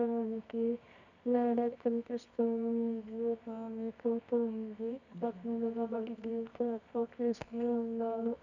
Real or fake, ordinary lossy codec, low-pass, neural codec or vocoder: fake; none; 7.2 kHz; codec, 24 kHz, 0.9 kbps, WavTokenizer, medium music audio release